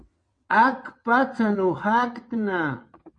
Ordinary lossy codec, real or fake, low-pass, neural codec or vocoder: MP3, 48 kbps; fake; 9.9 kHz; vocoder, 22.05 kHz, 80 mel bands, WaveNeXt